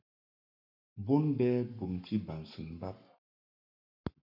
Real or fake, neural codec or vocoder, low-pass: fake; codec, 44.1 kHz, 7.8 kbps, Pupu-Codec; 5.4 kHz